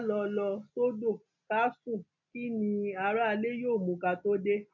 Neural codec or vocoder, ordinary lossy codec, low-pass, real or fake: none; none; 7.2 kHz; real